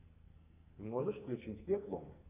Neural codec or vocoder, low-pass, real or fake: codec, 44.1 kHz, 2.6 kbps, SNAC; 3.6 kHz; fake